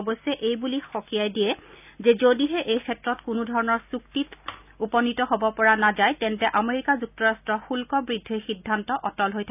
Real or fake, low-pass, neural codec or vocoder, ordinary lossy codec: real; 3.6 kHz; none; none